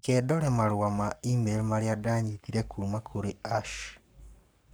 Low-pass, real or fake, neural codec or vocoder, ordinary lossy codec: none; fake; codec, 44.1 kHz, 7.8 kbps, Pupu-Codec; none